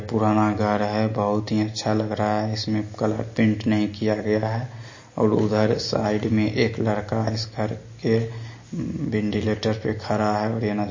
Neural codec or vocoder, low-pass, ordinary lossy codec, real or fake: none; 7.2 kHz; MP3, 32 kbps; real